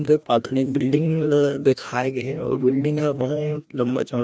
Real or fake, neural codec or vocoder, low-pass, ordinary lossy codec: fake; codec, 16 kHz, 1 kbps, FreqCodec, larger model; none; none